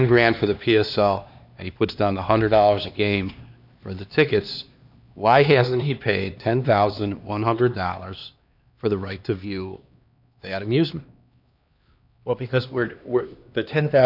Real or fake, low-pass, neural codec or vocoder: fake; 5.4 kHz; codec, 16 kHz, 2 kbps, X-Codec, HuBERT features, trained on LibriSpeech